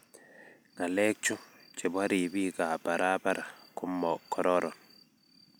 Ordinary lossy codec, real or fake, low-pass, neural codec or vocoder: none; real; none; none